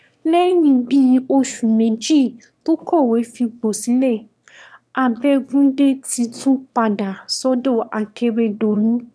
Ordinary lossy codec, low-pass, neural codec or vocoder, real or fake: none; none; autoencoder, 22.05 kHz, a latent of 192 numbers a frame, VITS, trained on one speaker; fake